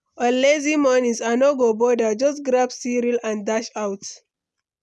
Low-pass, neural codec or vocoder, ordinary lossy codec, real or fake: none; none; none; real